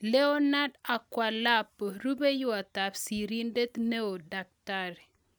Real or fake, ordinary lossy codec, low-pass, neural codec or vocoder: real; none; none; none